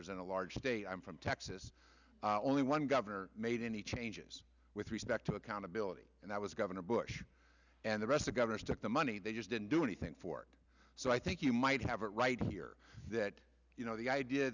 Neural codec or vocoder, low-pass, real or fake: none; 7.2 kHz; real